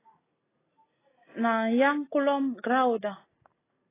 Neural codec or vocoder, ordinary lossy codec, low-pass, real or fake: none; AAC, 16 kbps; 3.6 kHz; real